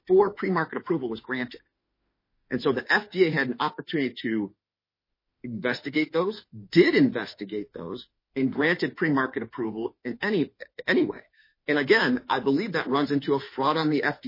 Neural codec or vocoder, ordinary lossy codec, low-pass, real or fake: autoencoder, 48 kHz, 32 numbers a frame, DAC-VAE, trained on Japanese speech; MP3, 24 kbps; 5.4 kHz; fake